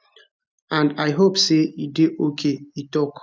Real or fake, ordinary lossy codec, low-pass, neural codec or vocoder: real; none; none; none